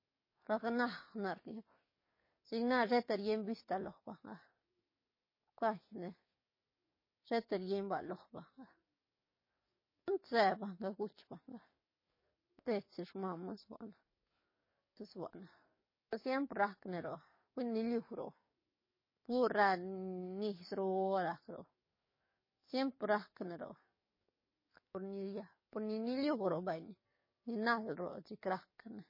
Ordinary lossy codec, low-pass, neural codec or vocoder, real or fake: MP3, 24 kbps; 5.4 kHz; codec, 44.1 kHz, 7.8 kbps, DAC; fake